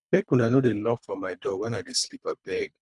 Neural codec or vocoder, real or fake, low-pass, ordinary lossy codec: codec, 24 kHz, 3 kbps, HILCodec; fake; 10.8 kHz; none